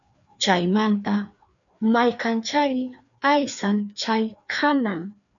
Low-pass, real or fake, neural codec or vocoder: 7.2 kHz; fake; codec, 16 kHz, 2 kbps, FreqCodec, larger model